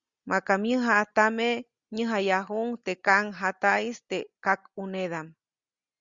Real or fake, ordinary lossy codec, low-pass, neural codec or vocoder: real; Opus, 64 kbps; 7.2 kHz; none